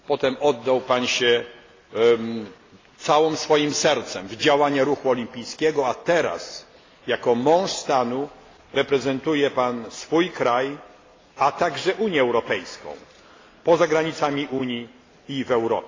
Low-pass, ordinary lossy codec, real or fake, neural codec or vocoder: 7.2 kHz; AAC, 32 kbps; real; none